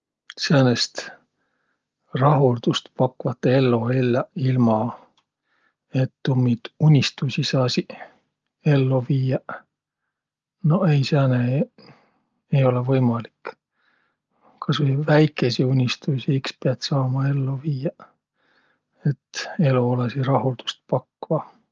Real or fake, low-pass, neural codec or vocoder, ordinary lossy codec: real; 7.2 kHz; none; Opus, 24 kbps